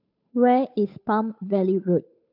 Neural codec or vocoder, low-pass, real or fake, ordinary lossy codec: codec, 16 kHz, 8 kbps, FunCodec, trained on Chinese and English, 25 frames a second; 5.4 kHz; fake; none